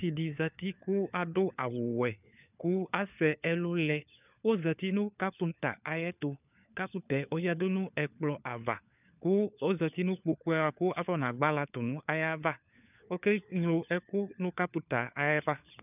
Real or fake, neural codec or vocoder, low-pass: fake; codec, 16 kHz, 4 kbps, FunCodec, trained on LibriTTS, 50 frames a second; 3.6 kHz